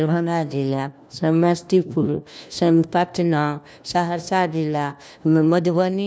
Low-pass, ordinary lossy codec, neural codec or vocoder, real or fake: none; none; codec, 16 kHz, 1 kbps, FunCodec, trained on LibriTTS, 50 frames a second; fake